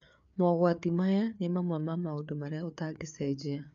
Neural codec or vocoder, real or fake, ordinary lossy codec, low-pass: codec, 16 kHz, 4 kbps, FreqCodec, larger model; fake; none; 7.2 kHz